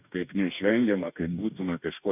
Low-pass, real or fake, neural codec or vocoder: 3.6 kHz; fake; codec, 44.1 kHz, 2.6 kbps, DAC